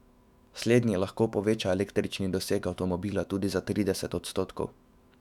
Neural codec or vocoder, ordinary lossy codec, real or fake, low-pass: autoencoder, 48 kHz, 128 numbers a frame, DAC-VAE, trained on Japanese speech; none; fake; 19.8 kHz